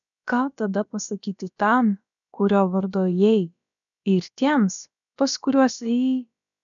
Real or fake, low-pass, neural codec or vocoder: fake; 7.2 kHz; codec, 16 kHz, about 1 kbps, DyCAST, with the encoder's durations